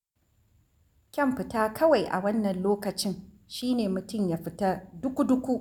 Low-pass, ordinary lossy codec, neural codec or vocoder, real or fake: 19.8 kHz; none; none; real